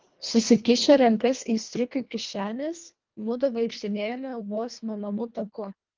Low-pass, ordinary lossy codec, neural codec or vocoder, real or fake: 7.2 kHz; Opus, 32 kbps; codec, 24 kHz, 1.5 kbps, HILCodec; fake